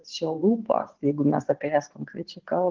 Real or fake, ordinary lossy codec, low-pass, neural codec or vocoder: fake; Opus, 16 kbps; 7.2 kHz; vocoder, 44.1 kHz, 128 mel bands every 512 samples, BigVGAN v2